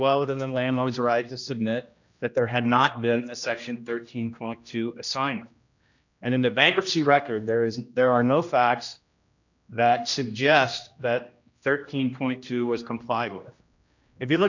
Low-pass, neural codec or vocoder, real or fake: 7.2 kHz; codec, 16 kHz, 1 kbps, X-Codec, HuBERT features, trained on general audio; fake